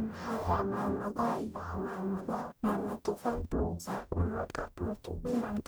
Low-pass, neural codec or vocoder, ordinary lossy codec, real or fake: none; codec, 44.1 kHz, 0.9 kbps, DAC; none; fake